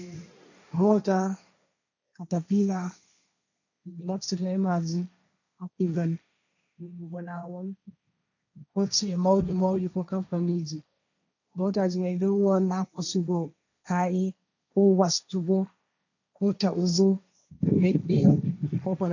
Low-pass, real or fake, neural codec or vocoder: 7.2 kHz; fake; codec, 16 kHz, 1.1 kbps, Voila-Tokenizer